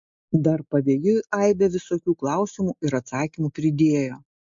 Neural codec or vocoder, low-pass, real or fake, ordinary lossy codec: none; 7.2 kHz; real; MP3, 48 kbps